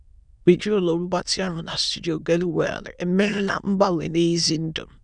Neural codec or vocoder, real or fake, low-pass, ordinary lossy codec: autoencoder, 22.05 kHz, a latent of 192 numbers a frame, VITS, trained on many speakers; fake; 9.9 kHz; none